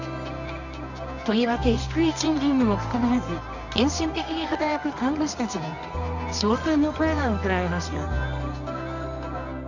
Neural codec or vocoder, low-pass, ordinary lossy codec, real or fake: codec, 24 kHz, 0.9 kbps, WavTokenizer, medium music audio release; 7.2 kHz; none; fake